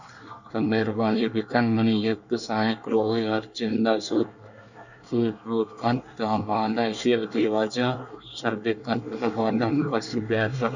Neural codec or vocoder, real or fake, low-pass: codec, 24 kHz, 1 kbps, SNAC; fake; 7.2 kHz